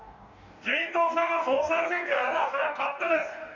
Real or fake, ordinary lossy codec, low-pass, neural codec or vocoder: fake; none; 7.2 kHz; codec, 44.1 kHz, 2.6 kbps, DAC